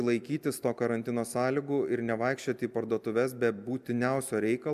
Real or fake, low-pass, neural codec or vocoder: real; 14.4 kHz; none